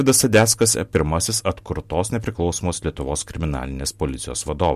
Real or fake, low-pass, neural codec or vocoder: real; 14.4 kHz; none